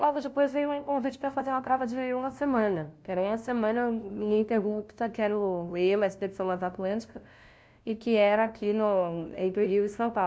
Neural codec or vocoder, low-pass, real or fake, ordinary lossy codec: codec, 16 kHz, 0.5 kbps, FunCodec, trained on LibriTTS, 25 frames a second; none; fake; none